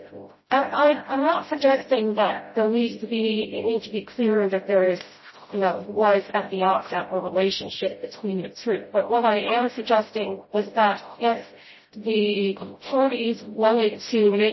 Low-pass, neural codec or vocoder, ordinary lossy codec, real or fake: 7.2 kHz; codec, 16 kHz, 0.5 kbps, FreqCodec, smaller model; MP3, 24 kbps; fake